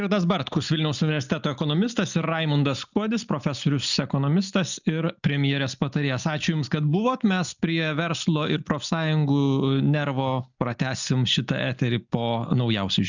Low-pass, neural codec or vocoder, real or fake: 7.2 kHz; none; real